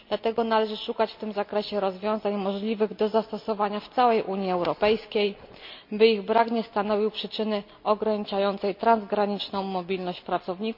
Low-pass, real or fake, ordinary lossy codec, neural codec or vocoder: 5.4 kHz; real; MP3, 48 kbps; none